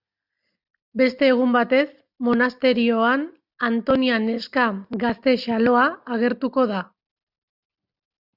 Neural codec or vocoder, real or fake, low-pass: none; real; 5.4 kHz